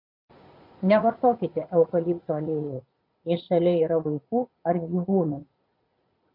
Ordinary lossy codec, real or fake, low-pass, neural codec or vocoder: MP3, 48 kbps; fake; 5.4 kHz; vocoder, 44.1 kHz, 80 mel bands, Vocos